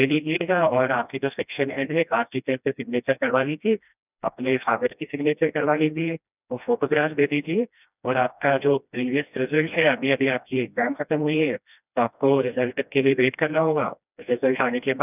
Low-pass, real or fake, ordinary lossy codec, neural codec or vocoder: 3.6 kHz; fake; none; codec, 16 kHz, 1 kbps, FreqCodec, smaller model